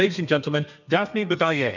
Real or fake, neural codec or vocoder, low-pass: fake; codec, 32 kHz, 1.9 kbps, SNAC; 7.2 kHz